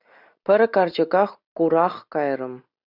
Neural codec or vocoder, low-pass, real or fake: none; 5.4 kHz; real